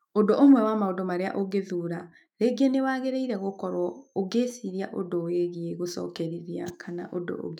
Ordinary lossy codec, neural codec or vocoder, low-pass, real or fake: none; autoencoder, 48 kHz, 128 numbers a frame, DAC-VAE, trained on Japanese speech; 19.8 kHz; fake